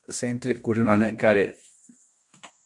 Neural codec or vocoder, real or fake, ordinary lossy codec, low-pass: codec, 16 kHz in and 24 kHz out, 0.9 kbps, LongCat-Audio-Codec, fine tuned four codebook decoder; fake; MP3, 64 kbps; 10.8 kHz